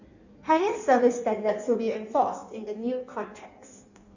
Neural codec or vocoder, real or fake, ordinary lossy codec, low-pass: codec, 16 kHz in and 24 kHz out, 1.1 kbps, FireRedTTS-2 codec; fake; none; 7.2 kHz